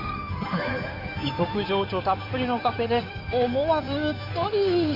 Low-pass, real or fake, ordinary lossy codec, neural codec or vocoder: 5.4 kHz; fake; none; codec, 16 kHz in and 24 kHz out, 2.2 kbps, FireRedTTS-2 codec